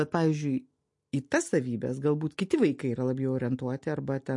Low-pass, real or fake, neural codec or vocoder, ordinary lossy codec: 10.8 kHz; real; none; MP3, 48 kbps